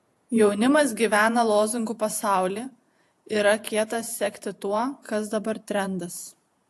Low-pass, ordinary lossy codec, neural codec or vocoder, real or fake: 14.4 kHz; AAC, 64 kbps; vocoder, 48 kHz, 128 mel bands, Vocos; fake